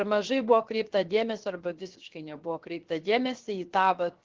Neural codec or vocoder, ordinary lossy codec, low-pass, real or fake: codec, 16 kHz, 0.7 kbps, FocalCodec; Opus, 16 kbps; 7.2 kHz; fake